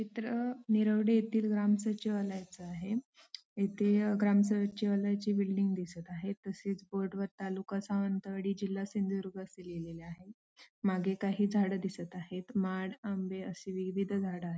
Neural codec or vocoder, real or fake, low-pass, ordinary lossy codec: none; real; none; none